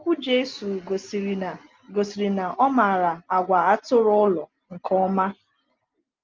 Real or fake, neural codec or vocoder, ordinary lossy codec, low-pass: real; none; Opus, 32 kbps; 7.2 kHz